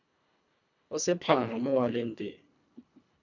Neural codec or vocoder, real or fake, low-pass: codec, 24 kHz, 1.5 kbps, HILCodec; fake; 7.2 kHz